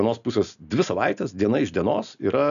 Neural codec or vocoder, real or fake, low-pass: none; real; 7.2 kHz